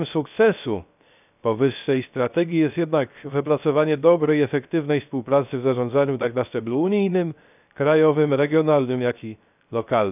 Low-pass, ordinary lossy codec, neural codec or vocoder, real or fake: 3.6 kHz; none; codec, 16 kHz, 0.3 kbps, FocalCodec; fake